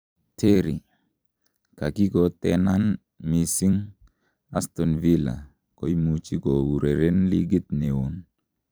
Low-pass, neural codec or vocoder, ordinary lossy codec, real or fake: none; vocoder, 44.1 kHz, 128 mel bands every 256 samples, BigVGAN v2; none; fake